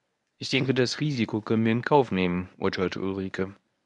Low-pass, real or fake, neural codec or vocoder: 10.8 kHz; fake; codec, 24 kHz, 0.9 kbps, WavTokenizer, medium speech release version 1